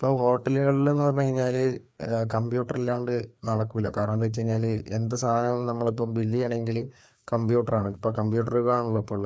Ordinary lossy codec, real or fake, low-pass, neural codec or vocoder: none; fake; none; codec, 16 kHz, 2 kbps, FreqCodec, larger model